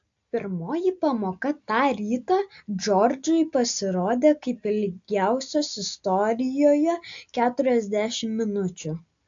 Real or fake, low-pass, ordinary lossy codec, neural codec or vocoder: real; 7.2 kHz; AAC, 64 kbps; none